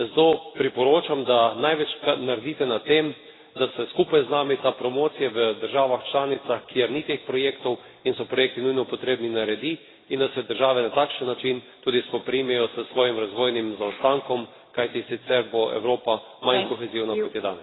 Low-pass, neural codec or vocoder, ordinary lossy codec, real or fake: 7.2 kHz; none; AAC, 16 kbps; real